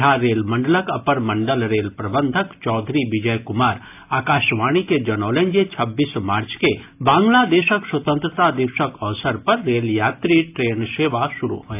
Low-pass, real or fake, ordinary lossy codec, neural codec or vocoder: 3.6 kHz; real; AAC, 32 kbps; none